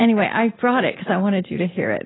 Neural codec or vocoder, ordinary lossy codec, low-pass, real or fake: none; AAC, 16 kbps; 7.2 kHz; real